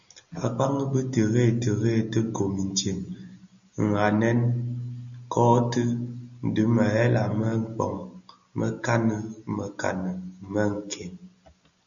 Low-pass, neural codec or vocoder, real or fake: 7.2 kHz; none; real